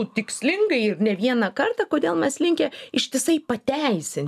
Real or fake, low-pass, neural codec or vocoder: real; 14.4 kHz; none